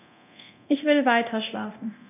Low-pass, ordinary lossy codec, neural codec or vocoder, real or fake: 3.6 kHz; none; codec, 24 kHz, 0.9 kbps, DualCodec; fake